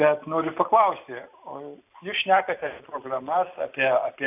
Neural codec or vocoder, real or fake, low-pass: none; real; 3.6 kHz